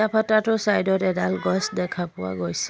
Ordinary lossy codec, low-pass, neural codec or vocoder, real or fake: none; none; none; real